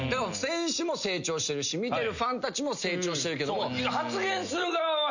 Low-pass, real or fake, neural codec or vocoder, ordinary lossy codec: 7.2 kHz; real; none; none